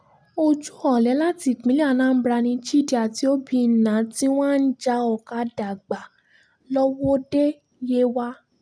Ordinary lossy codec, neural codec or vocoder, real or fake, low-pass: none; none; real; none